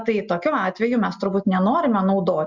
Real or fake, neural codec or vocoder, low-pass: real; none; 7.2 kHz